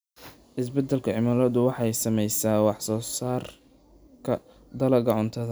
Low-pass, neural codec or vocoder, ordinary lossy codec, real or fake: none; none; none; real